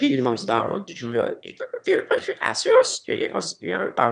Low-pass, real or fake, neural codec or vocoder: 9.9 kHz; fake; autoencoder, 22.05 kHz, a latent of 192 numbers a frame, VITS, trained on one speaker